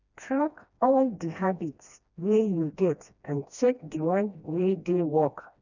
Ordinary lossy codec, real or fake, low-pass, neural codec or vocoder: none; fake; 7.2 kHz; codec, 16 kHz, 1 kbps, FreqCodec, smaller model